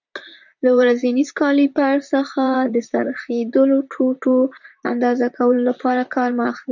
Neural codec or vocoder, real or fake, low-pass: vocoder, 44.1 kHz, 128 mel bands, Pupu-Vocoder; fake; 7.2 kHz